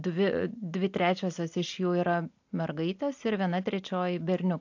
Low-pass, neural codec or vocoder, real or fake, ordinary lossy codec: 7.2 kHz; none; real; AAC, 48 kbps